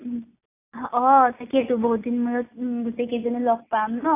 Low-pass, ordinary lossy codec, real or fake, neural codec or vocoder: 3.6 kHz; AAC, 24 kbps; real; none